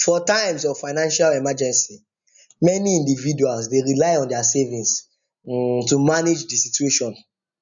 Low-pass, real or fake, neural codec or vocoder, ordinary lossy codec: 7.2 kHz; real; none; none